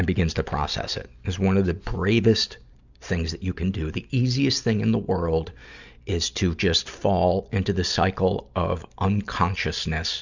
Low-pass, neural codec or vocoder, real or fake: 7.2 kHz; none; real